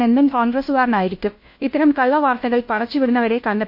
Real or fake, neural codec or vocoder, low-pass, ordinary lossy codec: fake; codec, 16 kHz, 1 kbps, FunCodec, trained on LibriTTS, 50 frames a second; 5.4 kHz; MP3, 32 kbps